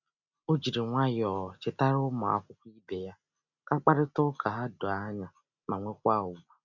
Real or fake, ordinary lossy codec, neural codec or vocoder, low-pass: real; none; none; 7.2 kHz